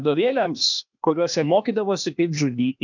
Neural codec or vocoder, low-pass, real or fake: codec, 16 kHz, 0.8 kbps, ZipCodec; 7.2 kHz; fake